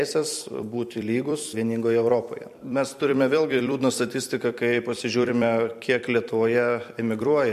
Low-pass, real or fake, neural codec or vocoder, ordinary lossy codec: 14.4 kHz; fake; vocoder, 44.1 kHz, 128 mel bands every 256 samples, BigVGAN v2; MP3, 64 kbps